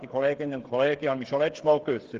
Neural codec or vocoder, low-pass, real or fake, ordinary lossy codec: codec, 16 kHz, 8 kbps, FreqCodec, smaller model; 7.2 kHz; fake; Opus, 16 kbps